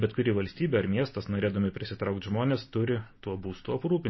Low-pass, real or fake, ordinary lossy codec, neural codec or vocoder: 7.2 kHz; real; MP3, 24 kbps; none